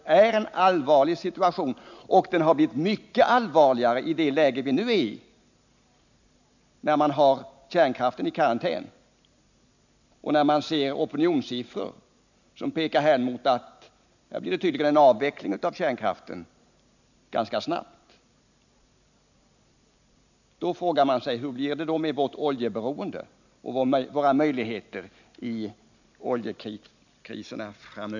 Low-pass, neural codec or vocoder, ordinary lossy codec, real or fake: 7.2 kHz; none; none; real